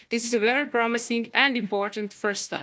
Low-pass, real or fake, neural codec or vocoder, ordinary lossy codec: none; fake; codec, 16 kHz, 1 kbps, FunCodec, trained on Chinese and English, 50 frames a second; none